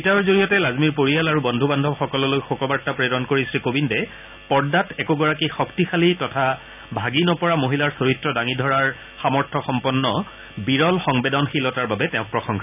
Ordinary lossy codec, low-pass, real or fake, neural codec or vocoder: none; 3.6 kHz; real; none